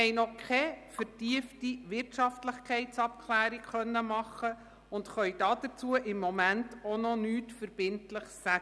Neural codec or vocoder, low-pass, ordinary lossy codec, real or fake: none; none; none; real